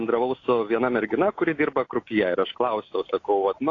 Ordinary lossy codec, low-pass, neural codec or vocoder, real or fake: AAC, 32 kbps; 7.2 kHz; none; real